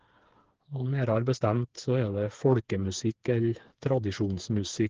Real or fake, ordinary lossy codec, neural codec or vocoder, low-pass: fake; Opus, 16 kbps; codec, 16 kHz, 4 kbps, FreqCodec, smaller model; 7.2 kHz